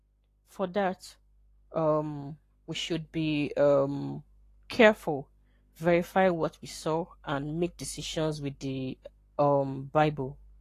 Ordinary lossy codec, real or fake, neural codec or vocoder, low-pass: AAC, 48 kbps; fake; codec, 44.1 kHz, 7.8 kbps, Pupu-Codec; 14.4 kHz